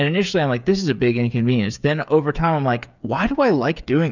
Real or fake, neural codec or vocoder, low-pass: fake; codec, 16 kHz, 8 kbps, FreqCodec, smaller model; 7.2 kHz